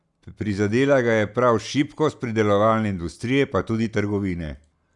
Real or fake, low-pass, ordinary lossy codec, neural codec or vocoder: real; 10.8 kHz; none; none